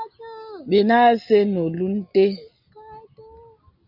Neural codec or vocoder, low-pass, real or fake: none; 5.4 kHz; real